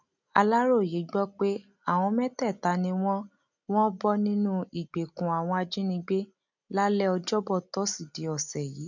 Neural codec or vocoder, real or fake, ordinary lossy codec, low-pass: none; real; none; 7.2 kHz